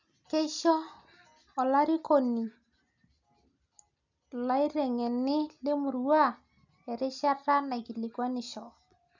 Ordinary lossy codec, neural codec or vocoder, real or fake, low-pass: none; none; real; 7.2 kHz